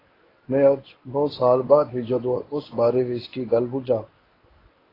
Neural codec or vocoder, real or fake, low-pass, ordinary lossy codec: codec, 24 kHz, 0.9 kbps, WavTokenizer, medium speech release version 1; fake; 5.4 kHz; AAC, 24 kbps